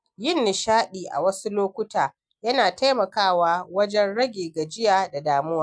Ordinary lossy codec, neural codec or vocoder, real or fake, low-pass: none; none; real; 9.9 kHz